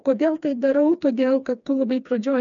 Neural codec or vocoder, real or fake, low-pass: codec, 16 kHz, 2 kbps, FreqCodec, smaller model; fake; 7.2 kHz